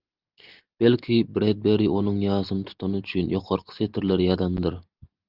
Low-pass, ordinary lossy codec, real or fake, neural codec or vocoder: 5.4 kHz; Opus, 32 kbps; real; none